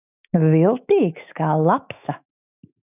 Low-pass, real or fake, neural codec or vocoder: 3.6 kHz; fake; autoencoder, 48 kHz, 128 numbers a frame, DAC-VAE, trained on Japanese speech